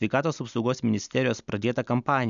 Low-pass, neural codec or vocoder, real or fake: 7.2 kHz; none; real